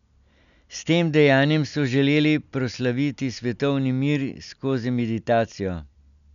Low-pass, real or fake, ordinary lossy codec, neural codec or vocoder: 7.2 kHz; real; MP3, 96 kbps; none